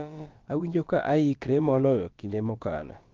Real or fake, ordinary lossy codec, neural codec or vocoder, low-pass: fake; Opus, 32 kbps; codec, 16 kHz, about 1 kbps, DyCAST, with the encoder's durations; 7.2 kHz